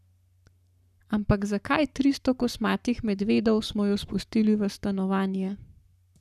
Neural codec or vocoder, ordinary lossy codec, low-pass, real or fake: codec, 44.1 kHz, 7.8 kbps, Pupu-Codec; none; 14.4 kHz; fake